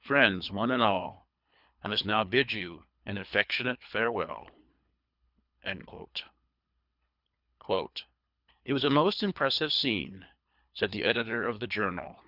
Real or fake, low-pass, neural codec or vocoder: fake; 5.4 kHz; codec, 24 kHz, 3 kbps, HILCodec